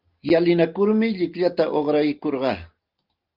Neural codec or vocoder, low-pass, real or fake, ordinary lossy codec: none; 5.4 kHz; real; Opus, 32 kbps